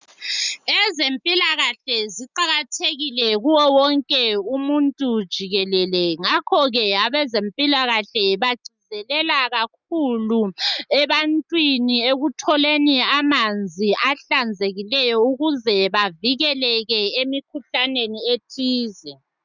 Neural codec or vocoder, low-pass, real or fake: none; 7.2 kHz; real